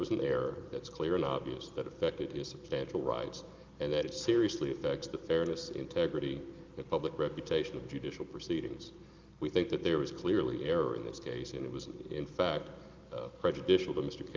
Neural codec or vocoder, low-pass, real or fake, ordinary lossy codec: none; 7.2 kHz; real; Opus, 32 kbps